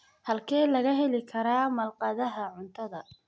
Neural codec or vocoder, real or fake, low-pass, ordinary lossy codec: none; real; none; none